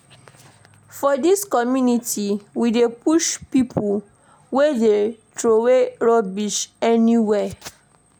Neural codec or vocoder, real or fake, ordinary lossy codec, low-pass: none; real; none; none